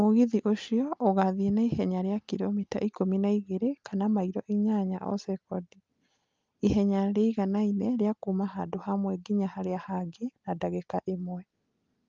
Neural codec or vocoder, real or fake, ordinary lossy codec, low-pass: none; real; Opus, 24 kbps; 7.2 kHz